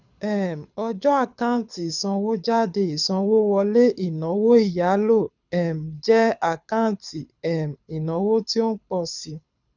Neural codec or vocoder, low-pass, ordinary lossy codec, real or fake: codec, 24 kHz, 6 kbps, HILCodec; 7.2 kHz; none; fake